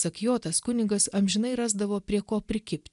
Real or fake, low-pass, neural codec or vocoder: real; 10.8 kHz; none